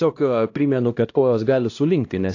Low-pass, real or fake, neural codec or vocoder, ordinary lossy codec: 7.2 kHz; fake; codec, 16 kHz, 1 kbps, X-Codec, HuBERT features, trained on LibriSpeech; AAC, 48 kbps